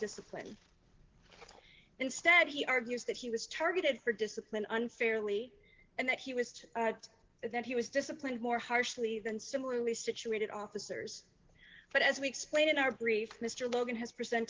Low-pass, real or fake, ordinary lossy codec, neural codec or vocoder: 7.2 kHz; real; Opus, 16 kbps; none